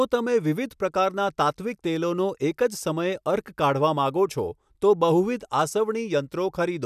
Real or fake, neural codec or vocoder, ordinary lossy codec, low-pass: real; none; none; 14.4 kHz